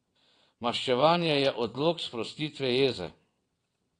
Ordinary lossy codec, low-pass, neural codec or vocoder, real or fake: AAC, 48 kbps; 9.9 kHz; vocoder, 22.05 kHz, 80 mel bands, WaveNeXt; fake